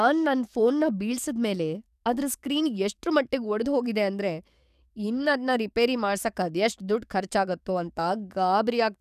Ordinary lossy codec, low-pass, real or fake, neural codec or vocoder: none; 14.4 kHz; fake; codec, 44.1 kHz, 7.8 kbps, DAC